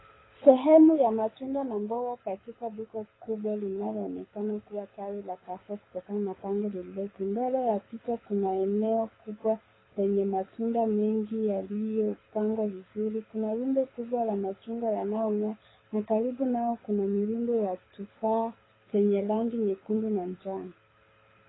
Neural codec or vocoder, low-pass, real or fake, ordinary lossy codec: codec, 24 kHz, 6 kbps, HILCodec; 7.2 kHz; fake; AAC, 16 kbps